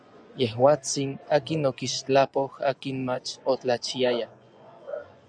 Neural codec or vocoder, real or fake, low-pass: vocoder, 24 kHz, 100 mel bands, Vocos; fake; 9.9 kHz